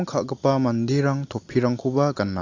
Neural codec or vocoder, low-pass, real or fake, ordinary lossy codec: none; 7.2 kHz; real; AAC, 48 kbps